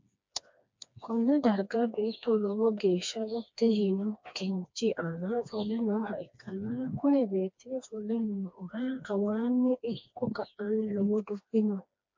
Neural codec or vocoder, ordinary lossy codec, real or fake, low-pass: codec, 16 kHz, 2 kbps, FreqCodec, smaller model; MP3, 48 kbps; fake; 7.2 kHz